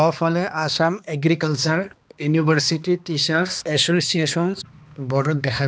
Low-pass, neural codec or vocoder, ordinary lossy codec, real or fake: none; codec, 16 kHz, 2 kbps, X-Codec, HuBERT features, trained on balanced general audio; none; fake